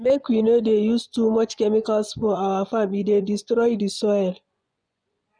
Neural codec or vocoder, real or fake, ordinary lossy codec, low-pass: vocoder, 24 kHz, 100 mel bands, Vocos; fake; none; 9.9 kHz